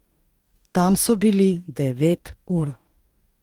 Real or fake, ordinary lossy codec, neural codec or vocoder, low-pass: fake; Opus, 32 kbps; codec, 44.1 kHz, 2.6 kbps, DAC; 19.8 kHz